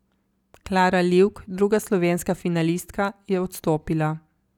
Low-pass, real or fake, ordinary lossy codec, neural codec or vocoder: 19.8 kHz; real; none; none